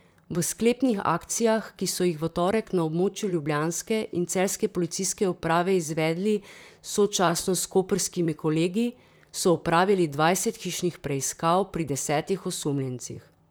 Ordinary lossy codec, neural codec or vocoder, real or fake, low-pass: none; vocoder, 44.1 kHz, 128 mel bands, Pupu-Vocoder; fake; none